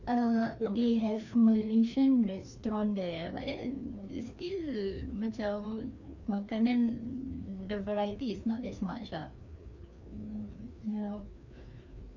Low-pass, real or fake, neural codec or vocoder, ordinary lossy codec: 7.2 kHz; fake; codec, 16 kHz, 2 kbps, FreqCodec, larger model; none